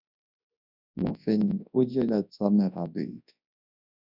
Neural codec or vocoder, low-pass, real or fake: codec, 24 kHz, 0.9 kbps, WavTokenizer, large speech release; 5.4 kHz; fake